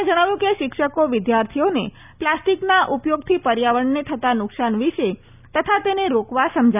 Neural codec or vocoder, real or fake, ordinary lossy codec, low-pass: none; real; none; 3.6 kHz